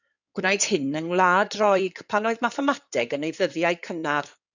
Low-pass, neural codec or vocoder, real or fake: 7.2 kHz; codec, 16 kHz in and 24 kHz out, 2.2 kbps, FireRedTTS-2 codec; fake